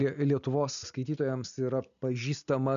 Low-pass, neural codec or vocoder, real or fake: 7.2 kHz; none; real